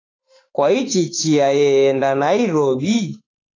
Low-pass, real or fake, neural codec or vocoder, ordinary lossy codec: 7.2 kHz; fake; autoencoder, 48 kHz, 32 numbers a frame, DAC-VAE, trained on Japanese speech; AAC, 32 kbps